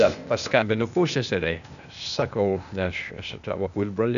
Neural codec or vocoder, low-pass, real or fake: codec, 16 kHz, 0.8 kbps, ZipCodec; 7.2 kHz; fake